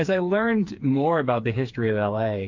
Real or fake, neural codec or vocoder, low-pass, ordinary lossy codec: fake; codec, 16 kHz, 4 kbps, FreqCodec, smaller model; 7.2 kHz; MP3, 48 kbps